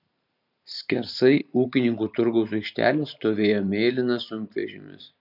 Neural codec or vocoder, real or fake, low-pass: vocoder, 44.1 kHz, 128 mel bands every 512 samples, BigVGAN v2; fake; 5.4 kHz